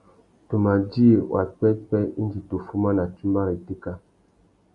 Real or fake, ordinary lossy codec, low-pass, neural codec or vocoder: real; AAC, 64 kbps; 10.8 kHz; none